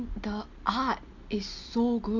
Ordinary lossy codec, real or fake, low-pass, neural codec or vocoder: none; real; 7.2 kHz; none